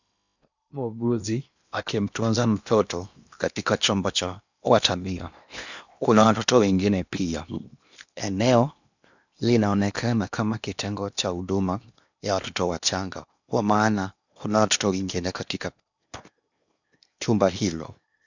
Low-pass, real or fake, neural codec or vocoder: 7.2 kHz; fake; codec, 16 kHz in and 24 kHz out, 0.8 kbps, FocalCodec, streaming, 65536 codes